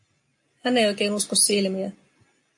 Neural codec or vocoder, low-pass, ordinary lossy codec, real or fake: none; 10.8 kHz; AAC, 64 kbps; real